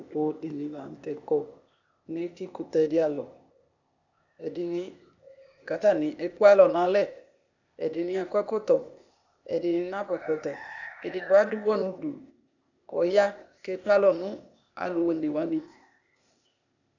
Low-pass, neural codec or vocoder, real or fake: 7.2 kHz; codec, 16 kHz, 0.8 kbps, ZipCodec; fake